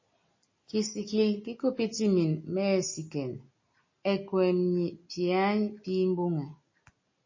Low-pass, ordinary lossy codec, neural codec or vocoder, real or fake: 7.2 kHz; MP3, 32 kbps; none; real